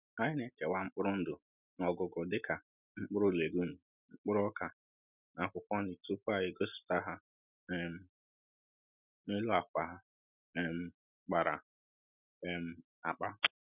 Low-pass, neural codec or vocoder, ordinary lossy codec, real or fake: 3.6 kHz; none; none; real